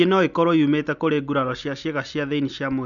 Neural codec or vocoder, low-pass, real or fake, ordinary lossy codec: none; 7.2 kHz; real; none